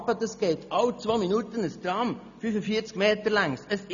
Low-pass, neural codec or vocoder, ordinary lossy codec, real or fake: 7.2 kHz; none; none; real